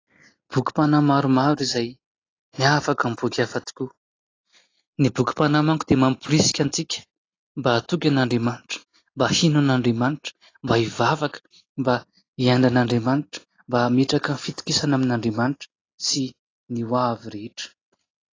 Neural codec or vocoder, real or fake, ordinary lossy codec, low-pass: none; real; AAC, 32 kbps; 7.2 kHz